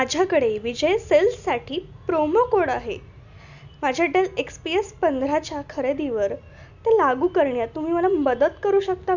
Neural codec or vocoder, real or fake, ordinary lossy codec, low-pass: none; real; none; 7.2 kHz